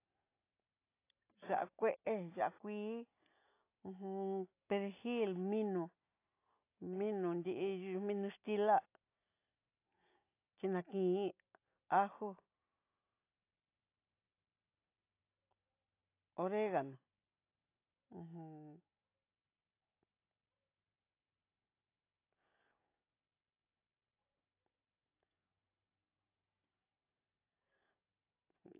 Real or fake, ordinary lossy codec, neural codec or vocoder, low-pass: real; AAC, 24 kbps; none; 3.6 kHz